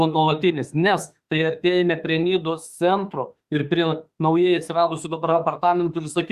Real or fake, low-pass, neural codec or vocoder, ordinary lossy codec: fake; 14.4 kHz; autoencoder, 48 kHz, 32 numbers a frame, DAC-VAE, trained on Japanese speech; Opus, 64 kbps